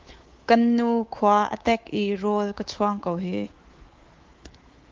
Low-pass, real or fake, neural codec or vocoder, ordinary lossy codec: 7.2 kHz; fake; codec, 24 kHz, 3.1 kbps, DualCodec; Opus, 16 kbps